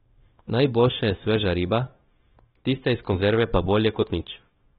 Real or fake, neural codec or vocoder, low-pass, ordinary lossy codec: fake; codec, 16 kHz, 0.9 kbps, LongCat-Audio-Codec; 7.2 kHz; AAC, 16 kbps